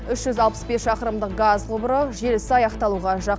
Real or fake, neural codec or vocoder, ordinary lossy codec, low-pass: real; none; none; none